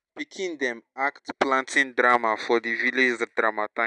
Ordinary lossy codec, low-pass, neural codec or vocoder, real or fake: none; 10.8 kHz; none; real